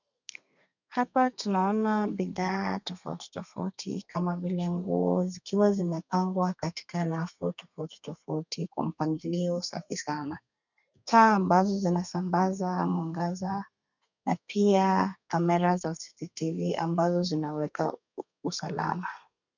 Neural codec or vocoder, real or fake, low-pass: codec, 32 kHz, 1.9 kbps, SNAC; fake; 7.2 kHz